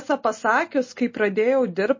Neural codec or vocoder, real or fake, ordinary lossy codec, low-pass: none; real; MP3, 32 kbps; 7.2 kHz